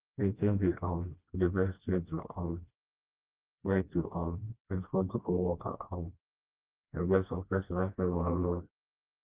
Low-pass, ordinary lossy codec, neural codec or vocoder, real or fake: 3.6 kHz; Opus, 32 kbps; codec, 16 kHz, 1 kbps, FreqCodec, smaller model; fake